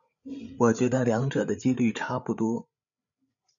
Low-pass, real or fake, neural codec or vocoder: 7.2 kHz; fake; codec, 16 kHz, 8 kbps, FreqCodec, larger model